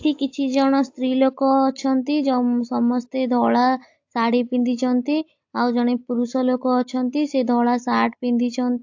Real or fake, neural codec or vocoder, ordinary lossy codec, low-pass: real; none; AAC, 48 kbps; 7.2 kHz